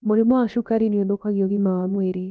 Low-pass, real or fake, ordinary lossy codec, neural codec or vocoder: none; fake; none; codec, 16 kHz, about 1 kbps, DyCAST, with the encoder's durations